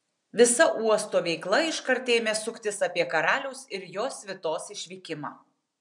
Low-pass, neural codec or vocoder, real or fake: 10.8 kHz; none; real